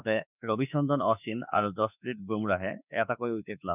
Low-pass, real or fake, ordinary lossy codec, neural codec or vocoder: 3.6 kHz; fake; none; autoencoder, 48 kHz, 32 numbers a frame, DAC-VAE, trained on Japanese speech